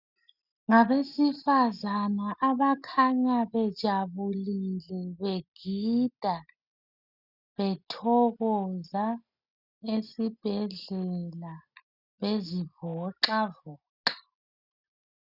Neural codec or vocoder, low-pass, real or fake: none; 5.4 kHz; real